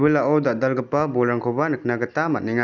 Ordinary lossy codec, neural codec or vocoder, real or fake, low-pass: none; none; real; 7.2 kHz